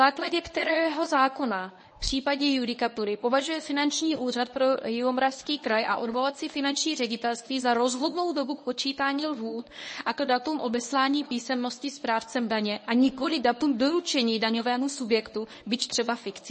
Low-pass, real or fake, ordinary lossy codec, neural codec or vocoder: 10.8 kHz; fake; MP3, 32 kbps; codec, 24 kHz, 0.9 kbps, WavTokenizer, medium speech release version 1